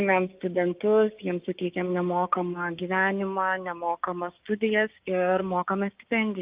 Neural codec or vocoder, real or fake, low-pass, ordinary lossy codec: codec, 16 kHz, 6 kbps, DAC; fake; 3.6 kHz; Opus, 24 kbps